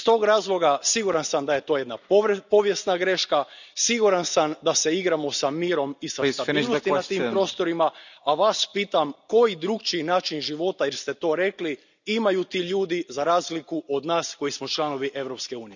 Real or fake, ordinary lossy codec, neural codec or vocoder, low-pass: real; none; none; 7.2 kHz